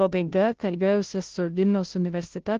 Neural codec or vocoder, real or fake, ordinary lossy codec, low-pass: codec, 16 kHz, 0.5 kbps, FunCodec, trained on Chinese and English, 25 frames a second; fake; Opus, 16 kbps; 7.2 kHz